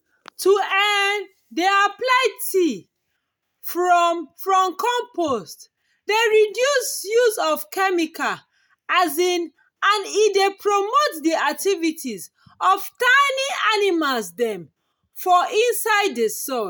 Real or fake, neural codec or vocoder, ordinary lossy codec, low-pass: real; none; none; none